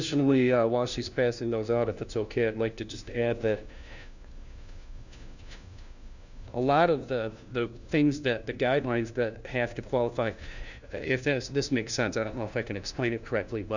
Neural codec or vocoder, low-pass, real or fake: codec, 16 kHz, 1 kbps, FunCodec, trained on LibriTTS, 50 frames a second; 7.2 kHz; fake